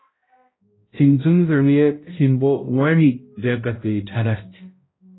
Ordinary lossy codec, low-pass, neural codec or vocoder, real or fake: AAC, 16 kbps; 7.2 kHz; codec, 16 kHz, 0.5 kbps, X-Codec, HuBERT features, trained on balanced general audio; fake